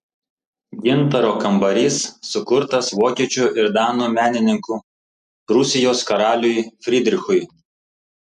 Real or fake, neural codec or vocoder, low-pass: real; none; 14.4 kHz